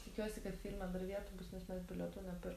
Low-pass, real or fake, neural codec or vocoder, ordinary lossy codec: 14.4 kHz; real; none; AAC, 64 kbps